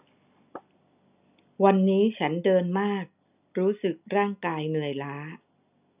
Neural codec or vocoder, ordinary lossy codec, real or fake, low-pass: none; none; real; 3.6 kHz